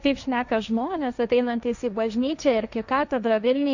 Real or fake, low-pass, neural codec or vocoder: fake; 7.2 kHz; codec, 16 kHz, 1.1 kbps, Voila-Tokenizer